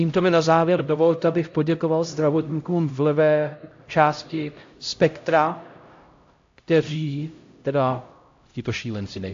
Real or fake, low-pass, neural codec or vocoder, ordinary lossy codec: fake; 7.2 kHz; codec, 16 kHz, 0.5 kbps, X-Codec, HuBERT features, trained on LibriSpeech; AAC, 48 kbps